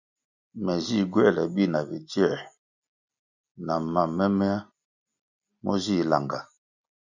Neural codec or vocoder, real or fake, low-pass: none; real; 7.2 kHz